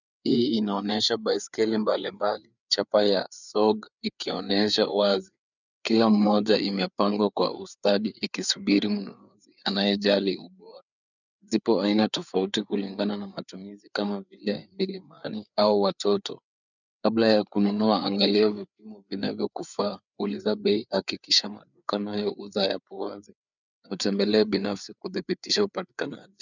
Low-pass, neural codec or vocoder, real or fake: 7.2 kHz; codec, 16 kHz, 4 kbps, FreqCodec, larger model; fake